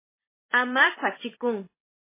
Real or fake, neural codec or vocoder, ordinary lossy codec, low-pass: fake; vocoder, 44.1 kHz, 128 mel bands, Pupu-Vocoder; MP3, 16 kbps; 3.6 kHz